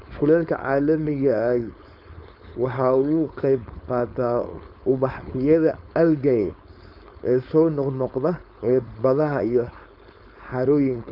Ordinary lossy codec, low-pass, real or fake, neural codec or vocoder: none; 5.4 kHz; fake; codec, 16 kHz, 4.8 kbps, FACodec